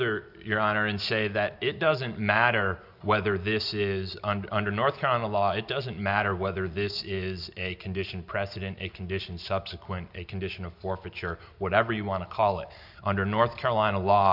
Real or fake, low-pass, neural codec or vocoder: real; 5.4 kHz; none